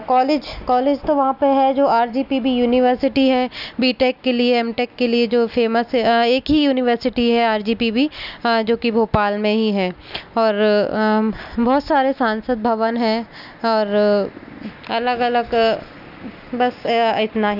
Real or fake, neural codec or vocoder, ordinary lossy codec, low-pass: real; none; none; 5.4 kHz